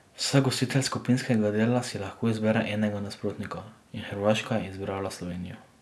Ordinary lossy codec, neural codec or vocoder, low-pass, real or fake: none; none; none; real